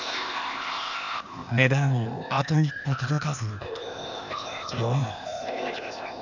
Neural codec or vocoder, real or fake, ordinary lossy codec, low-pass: codec, 16 kHz, 0.8 kbps, ZipCodec; fake; none; 7.2 kHz